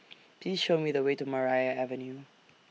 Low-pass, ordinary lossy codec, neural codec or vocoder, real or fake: none; none; none; real